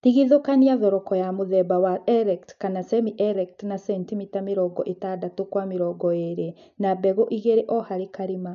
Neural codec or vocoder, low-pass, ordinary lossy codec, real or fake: none; 7.2 kHz; MP3, 64 kbps; real